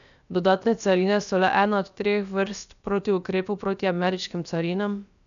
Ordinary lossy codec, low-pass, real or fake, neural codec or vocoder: none; 7.2 kHz; fake; codec, 16 kHz, about 1 kbps, DyCAST, with the encoder's durations